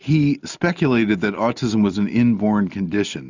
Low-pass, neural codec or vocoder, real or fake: 7.2 kHz; none; real